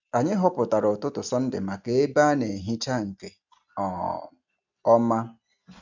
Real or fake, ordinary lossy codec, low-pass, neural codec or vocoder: real; none; 7.2 kHz; none